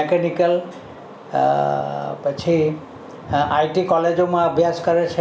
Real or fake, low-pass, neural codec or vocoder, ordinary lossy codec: real; none; none; none